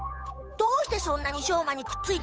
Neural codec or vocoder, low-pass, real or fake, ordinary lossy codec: codec, 16 kHz, 8 kbps, FunCodec, trained on Chinese and English, 25 frames a second; none; fake; none